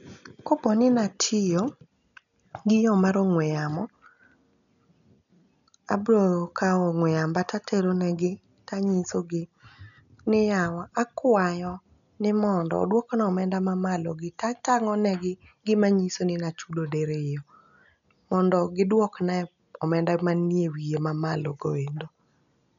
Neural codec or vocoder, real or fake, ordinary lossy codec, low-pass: none; real; none; 7.2 kHz